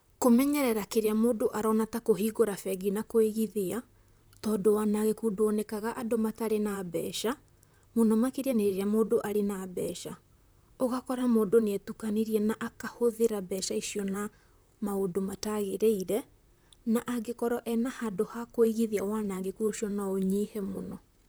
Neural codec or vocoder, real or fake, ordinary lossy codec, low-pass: vocoder, 44.1 kHz, 128 mel bands, Pupu-Vocoder; fake; none; none